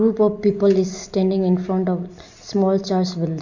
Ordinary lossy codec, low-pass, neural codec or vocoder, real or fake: none; 7.2 kHz; none; real